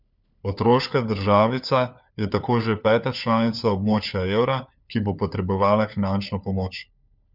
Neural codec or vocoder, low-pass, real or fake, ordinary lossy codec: codec, 16 kHz, 4 kbps, FunCodec, trained on LibriTTS, 50 frames a second; 5.4 kHz; fake; none